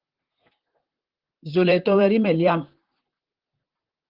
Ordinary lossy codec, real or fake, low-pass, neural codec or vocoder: Opus, 16 kbps; fake; 5.4 kHz; vocoder, 44.1 kHz, 128 mel bands, Pupu-Vocoder